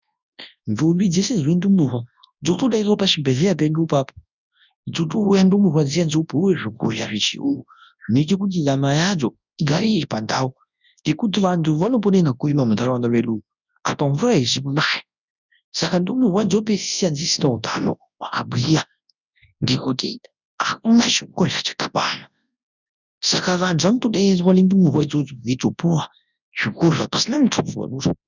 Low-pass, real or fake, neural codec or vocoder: 7.2 kHz; fake; codec, 24 kHz, 0.9 kbps, WavTokenizer, large speech release